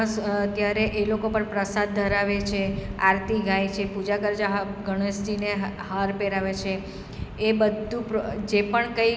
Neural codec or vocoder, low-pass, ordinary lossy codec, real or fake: none; none; none; real